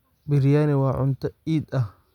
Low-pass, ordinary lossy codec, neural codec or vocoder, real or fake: 19.8 kHz; none; none; real